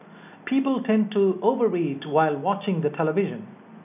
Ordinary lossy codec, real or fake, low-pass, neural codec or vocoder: none; real; 3.6 kHz; none